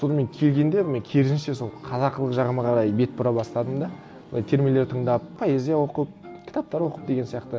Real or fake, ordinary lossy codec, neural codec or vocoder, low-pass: real; none; none; none